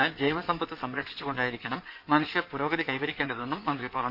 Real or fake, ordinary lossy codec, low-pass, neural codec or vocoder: fake; none; 5.4 kHz; codec, 16 kHz in and 24 kHz out, 2.2 kbps, FireRedTTS-2 codec